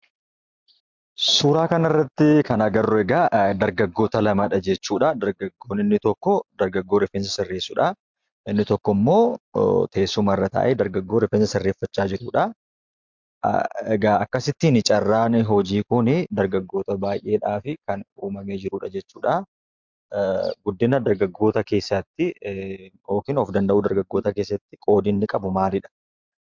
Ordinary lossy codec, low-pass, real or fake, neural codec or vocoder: MP3, 64 kbps; 7.2 kHz; real; none